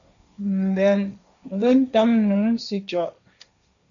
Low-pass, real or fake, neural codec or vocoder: 7.2 kHz; fake; codec, 16 kHz, 1.1 kbps, Voila-Tokenizer